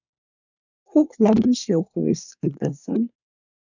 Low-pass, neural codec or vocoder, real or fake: 7.2 kHz; codec, 24 kHz, 1 kbps, SNAC; fake